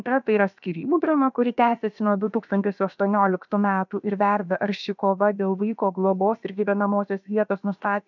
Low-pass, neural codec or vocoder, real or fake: 7.2 kHz; codec, 16 kHz, about 1 kbps, DyCAST, with the encoder's durations; fake